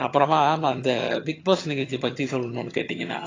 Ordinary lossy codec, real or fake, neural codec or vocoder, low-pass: AAC, 32 kbps; fake; vocoder, 22.05 kHz, 80 mel bands, HiFi-GAN; 7.2 kHz